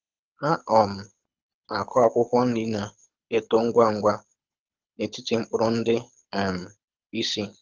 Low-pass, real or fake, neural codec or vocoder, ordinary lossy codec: 7.2 kHz; fake; codec, 24 kHz, 6 kbps, HILCodec; Opus, 24 kbps